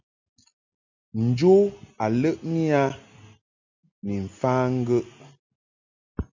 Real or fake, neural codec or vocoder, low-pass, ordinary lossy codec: real; none; 7.2 kHz; MP3, 64 kbps